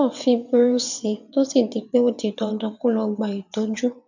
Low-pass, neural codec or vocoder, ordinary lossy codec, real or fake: 7.2 kHz; vocoder, 22.05 kHz, 80 mel bands, WaveNeXt; none; fake